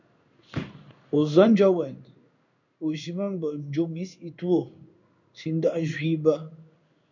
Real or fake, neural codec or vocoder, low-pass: fake; codec, 16 kHz in and 24 kHz out, 1 kbps, XY-Tokenizer; 7.2 kHz